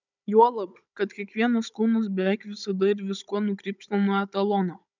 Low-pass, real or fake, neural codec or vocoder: 7.2 kHz; fake; codec, 16 kHz, 16 kbps, FunCodec, trained on Chinese and English, 50 frames a second